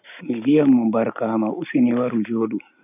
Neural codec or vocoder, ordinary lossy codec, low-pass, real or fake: none; none; 3.6 kHz; real